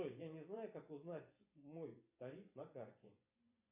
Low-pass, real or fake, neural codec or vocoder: 3.6 kHz; fake; vocoder, 24 kHz, 100 mel bands, Vocos